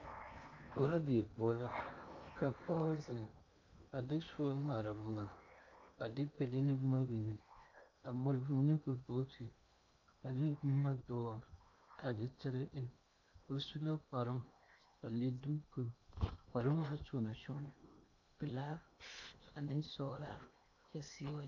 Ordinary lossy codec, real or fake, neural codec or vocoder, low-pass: AAC, 48 kbps; fake; codec, 16 kHz in and 24 kHz out, 0.8 kbps, FocalCodec, streaming, 65536 codes; 7.2 kHz